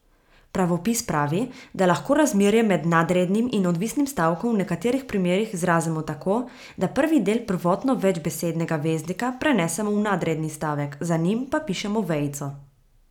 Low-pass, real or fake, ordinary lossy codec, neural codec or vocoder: 19.8 kHz; real; none; none